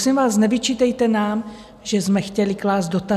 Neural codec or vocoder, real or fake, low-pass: none; real; 14.4 kHz